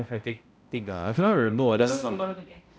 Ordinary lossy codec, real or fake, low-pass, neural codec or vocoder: none; fake; none; codec, 16 kHz, 0.5 kbps, X-Codec, HuBERT features, trained on balanced general audio